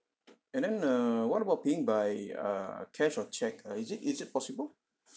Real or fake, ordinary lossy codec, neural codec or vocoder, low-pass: real; none; none; none